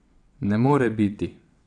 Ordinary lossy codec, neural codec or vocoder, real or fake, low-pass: MP3, 96 kbps; vocoder, 22.05 kHz, 80 mel bands, WaveNeXt; fake; 9.9 kHz